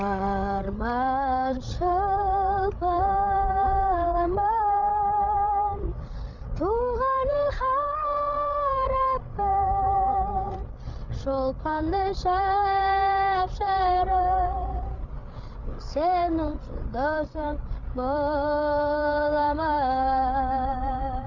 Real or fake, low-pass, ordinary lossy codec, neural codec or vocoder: fake; 7.2 kHz; none; codec, 16 kHz, 8 kbps, FreqCodec, larger model